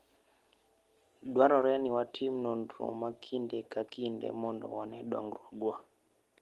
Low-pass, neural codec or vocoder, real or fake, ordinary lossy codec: 14.4 kHz; none; real; Opus, 24 kbps